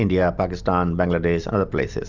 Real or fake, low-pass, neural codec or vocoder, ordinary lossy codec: real; 7.2 kHz; none; Opus, 64 kbps